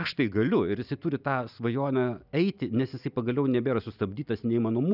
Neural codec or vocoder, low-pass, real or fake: none; 5.4 kHz; real